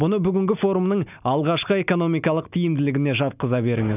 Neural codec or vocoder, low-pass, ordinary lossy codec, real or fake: none; 3.6 kHz; none; real